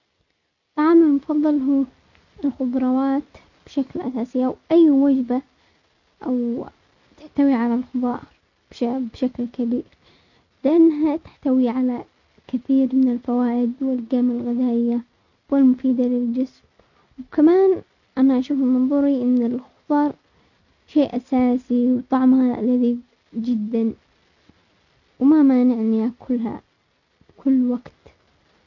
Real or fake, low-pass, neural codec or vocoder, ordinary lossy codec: real; 7.2 kHz; none; none